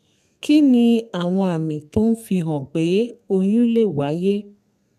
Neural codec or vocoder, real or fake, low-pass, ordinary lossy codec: codec, 32 kHz, 1.9 kbps, SNAC; fake; 14.4 kHz; none